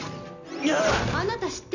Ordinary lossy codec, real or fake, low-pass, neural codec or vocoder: none; real; 7.2 kHz; none